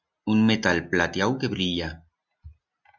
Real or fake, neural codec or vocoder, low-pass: real; none; 7.2 kHz